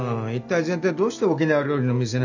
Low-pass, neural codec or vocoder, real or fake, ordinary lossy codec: 7.2 kHz; none; real; none